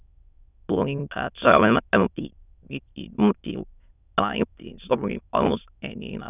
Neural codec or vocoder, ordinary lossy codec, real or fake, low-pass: autoencoder, 22.05 kHz, a latent of 192 numbers a frame, VITS, trained on many speakers; none; fake; 3.6 kHz